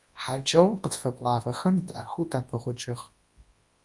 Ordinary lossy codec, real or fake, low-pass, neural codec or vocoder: Opus, 32 kbps; fake; 10.8 kHz; codec, 24 kHz, 0.9 kbps, WavTokenizer, large speech release